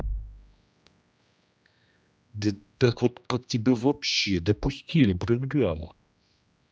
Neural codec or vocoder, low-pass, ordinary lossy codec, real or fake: codec, 16 kHz, 1 kbps, X-Codec, HuBERT features, trained on general audio; none; none; fake